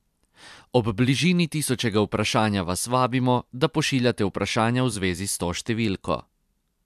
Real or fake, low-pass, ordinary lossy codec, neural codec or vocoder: fake; 14.4 kHz; MP3, 96 kbps; vocoder, 44.1 kHz, 128 mel bands every 256 samples, BigVGAN v2